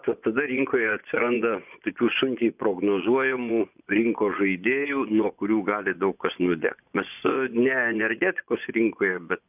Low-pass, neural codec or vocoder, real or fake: 3.6 kHz; vocoder, 24 kHz, 100 mel bands, Vocos; fake